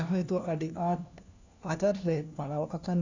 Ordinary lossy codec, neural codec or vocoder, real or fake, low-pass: none; codec, 16 kHz, 1 kbps, FunCodec, trained on LibriTTS, 50 frames a second; fake; 7.2 kHz